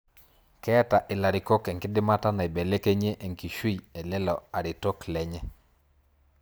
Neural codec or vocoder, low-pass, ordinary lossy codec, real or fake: none; none; none; real